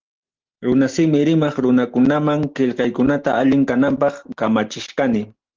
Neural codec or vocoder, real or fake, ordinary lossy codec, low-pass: none; real; Opus, 16 kbps; 7.2 kHz